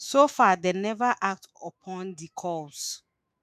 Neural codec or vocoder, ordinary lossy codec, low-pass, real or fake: autoencoder, 48 kHz, 128 numbers a frame, DAC-VAE, trained on Japanese speech; none; 14.4 kHz; fake